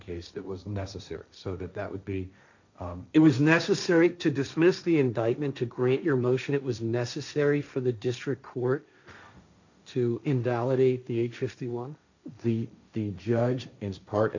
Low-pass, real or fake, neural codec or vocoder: 7.2 kHz; fake; codec, 16 kHz, 1.1 kbps, Voila-Tokenizer